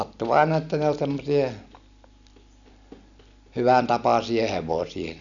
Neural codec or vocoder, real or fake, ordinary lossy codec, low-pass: none; real; none; 7.2 kHz